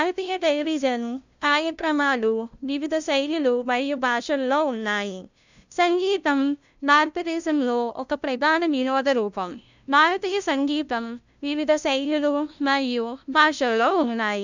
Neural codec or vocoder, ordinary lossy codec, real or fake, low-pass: codec, 16 kHz, 0.5 kbps, FunCodec, trained on LibriTTS, 25 frames a second; none; fake; 7.2 kHz